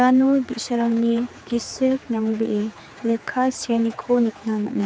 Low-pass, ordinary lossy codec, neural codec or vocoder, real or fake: none; none; codec, 16 kHz, 4 kbps, X-Codec, HuBERT features, trained on general audio; fake